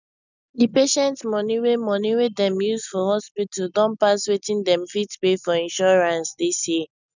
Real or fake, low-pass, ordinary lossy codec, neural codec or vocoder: real; 7.2 kHz; none; none